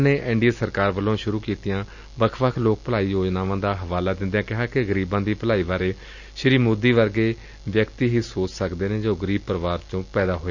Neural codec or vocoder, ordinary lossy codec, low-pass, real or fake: none; none; 7.2 kHz; real